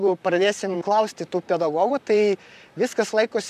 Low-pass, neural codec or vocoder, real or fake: 14.4 kHz; vocoder, 44.1 kHz, 128 mel bands, Pupu-Vocoder; fake